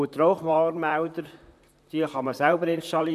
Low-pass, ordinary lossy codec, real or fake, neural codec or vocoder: 14.4 kHz; none; fake; vocoder, 44.1 kHz, 128 mel bands, Pupu-Vocoder